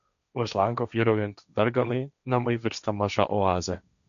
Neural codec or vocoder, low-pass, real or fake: codec, 16 kHz, 1.1 kbps, Voila-Tokenizer; 7.2 kHz; fake